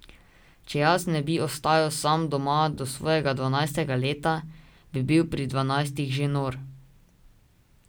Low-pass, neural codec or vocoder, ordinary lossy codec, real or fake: none; none; none; real